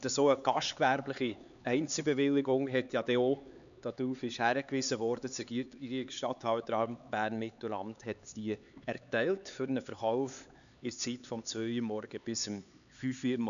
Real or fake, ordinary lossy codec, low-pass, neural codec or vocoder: fake; none; 7.2 kHz; codec, 16 kHz, 4 kbps, X-Codec, HuBERT features, trained on LibriSpeech